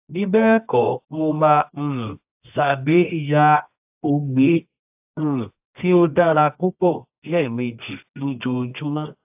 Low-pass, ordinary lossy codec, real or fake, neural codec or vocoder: 3.6 kHz; AAC, 32 kbps; fake; codec, 24 kHz, 0.9 kbps, WavTokenizer, medium music audio release